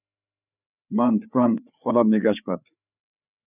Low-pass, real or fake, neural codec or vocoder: 3.6 kHz; fake; codec, 16 kHz, 4 kbps, FreqCodec, larger model